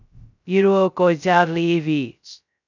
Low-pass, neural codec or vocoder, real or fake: 7.2 kHz; codec, 16 kHz, 0.2 kbps, FocalCodec; fake